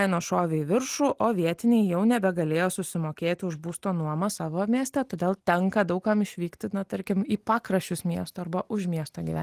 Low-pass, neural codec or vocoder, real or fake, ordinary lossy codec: 14.4 kHz; none; real; Opus, 24 kbps